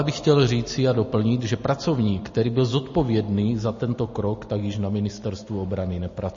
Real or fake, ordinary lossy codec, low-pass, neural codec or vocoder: real; MP3, 32 kbps; 7.2 kHz; none